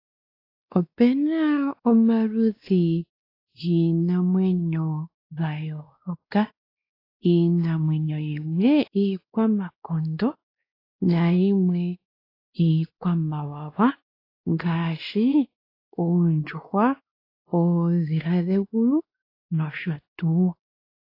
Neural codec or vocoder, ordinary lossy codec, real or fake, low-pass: codec, 16 kHz, 2 kbps, X-Codec, WavLM features, trained on Multilingual LibriSpeech; AAC, 32 kbps; fake; 5.4 kHz